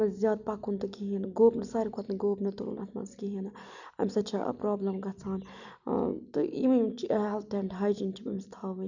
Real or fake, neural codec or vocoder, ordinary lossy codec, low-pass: real; none; none; 7.2 kHz